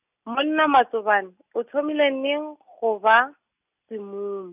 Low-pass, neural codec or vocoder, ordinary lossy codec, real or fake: 3.6 kHz; none; none; real